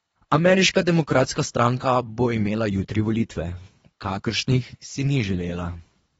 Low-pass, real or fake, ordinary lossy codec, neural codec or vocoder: 10.8 kHz; fake; AAC, 24 kbps; codec, 24 kHz, 3 kbps, HILCodec